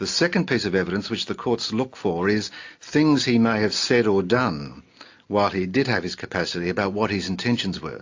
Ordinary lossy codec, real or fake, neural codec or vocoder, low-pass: AAC, 48 kbps; real; none; 7.2 kHz